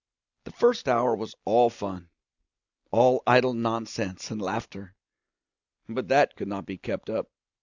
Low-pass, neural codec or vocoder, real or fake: 7.2 kHz; none; real